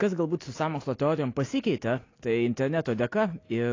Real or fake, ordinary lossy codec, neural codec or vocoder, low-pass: real; AAC, 32 kbps; none; 7.2 kHz